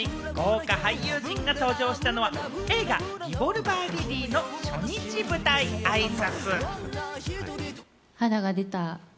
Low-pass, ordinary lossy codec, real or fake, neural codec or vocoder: none; none; real; none